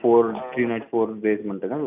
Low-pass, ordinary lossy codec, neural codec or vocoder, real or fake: 3.6 kHz; none; none; real